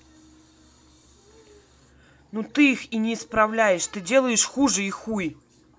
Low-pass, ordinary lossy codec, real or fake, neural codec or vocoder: none; none; real; none